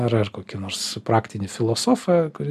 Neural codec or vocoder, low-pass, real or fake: none; 14.4 kHz; real